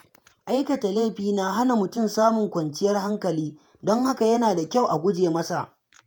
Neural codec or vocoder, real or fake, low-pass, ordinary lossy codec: vocoder, 48 kHz, 128 mel bands, Vocos; fake; none; none